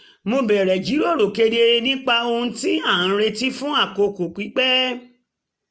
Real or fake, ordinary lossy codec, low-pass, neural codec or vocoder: real; none; none; none